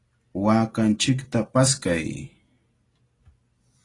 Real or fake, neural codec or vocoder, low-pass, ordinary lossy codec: real; none; 10.8 kHz; AAC, 32 kbps